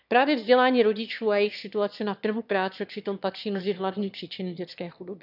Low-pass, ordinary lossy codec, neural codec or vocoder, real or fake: 5.4 kHz; none; autoencoder, 22.05 kHz, a latent of 192 numbers a frame, VITS, trained on one speaker; fake